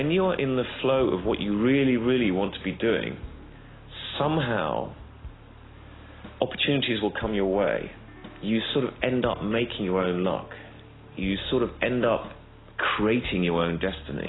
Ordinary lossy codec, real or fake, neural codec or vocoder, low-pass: AAC, 16 kbps; real; none; 7.2 kHz